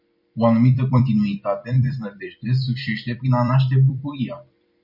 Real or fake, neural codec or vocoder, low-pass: fake; vocoder, 44.1 kHz, 128 mel bands every 256 samples, BigVGAN v2; 5.4 kHz